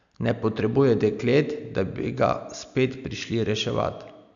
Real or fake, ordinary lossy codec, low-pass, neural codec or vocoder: real; none; 7.2 kHz; none